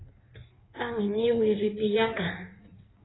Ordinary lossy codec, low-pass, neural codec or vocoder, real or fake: AAC, 16 kbps; 7.2 kHz; codec, 16 kHz in and 24 kHz out, 1.1 kbps, FireRedTTS-2 codec; fake